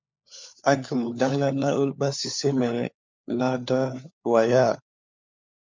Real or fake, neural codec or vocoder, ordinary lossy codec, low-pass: fake; codec, 16 kHz, 4 kbps, FunCodec, trained on LibriTTS, 50 frames a second; MP3, 64 kbps; 7.2 kHz